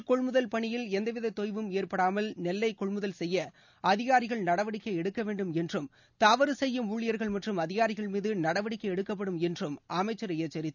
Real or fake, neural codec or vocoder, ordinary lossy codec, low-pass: real; none; none; 7.2 kHz